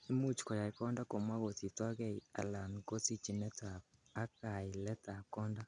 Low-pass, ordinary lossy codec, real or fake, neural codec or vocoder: 9.9 kHz; none; real; none